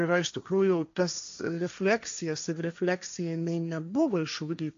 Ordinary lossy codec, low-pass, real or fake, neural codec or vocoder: AAC, 64 kbps; 7.2 kHz; fake; codec, 16 kHz, 1.1 kbps, Voila-Tokenizer